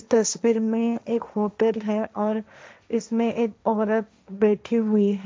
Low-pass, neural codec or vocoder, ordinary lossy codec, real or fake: none; codec, 16 kHz, 1.1 kbps, Voila-Tokenizer; none; fake